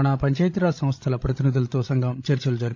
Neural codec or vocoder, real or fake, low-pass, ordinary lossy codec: codec, 16 kHz, 16 kbps, FreqCodec, larger model; fake; none; none